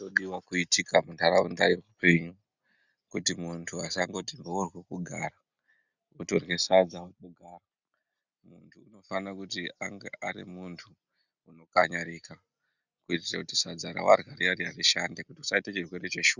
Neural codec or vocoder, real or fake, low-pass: none; real; 7.2 kHz